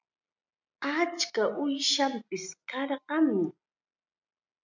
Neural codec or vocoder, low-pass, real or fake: none; 7.2 kHz; real